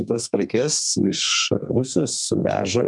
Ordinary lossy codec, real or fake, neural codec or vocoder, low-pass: MP3, 96 kbps; fake; codec, 32 kHz, 1.9 kbps, SNAC; 10.8 kHz